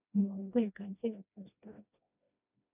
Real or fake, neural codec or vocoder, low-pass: fake; codec, 16 kHz, 1 kbps, FreqCodec, smaller model; 3.6 kHz